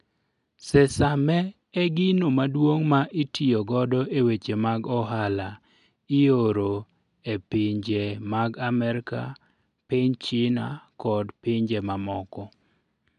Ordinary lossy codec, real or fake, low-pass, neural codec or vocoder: none; real; 10.8 kHz; none